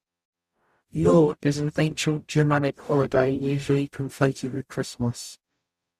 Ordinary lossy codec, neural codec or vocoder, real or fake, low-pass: Opus, 64 kbps; codec, 44.1 kHz, 0.9 kbps, DAC; fake; 14.4 kHz